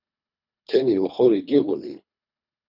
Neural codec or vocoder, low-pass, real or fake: codec, 24 kHz, 3 kbps, HILCodec; 5.4 kHz; fake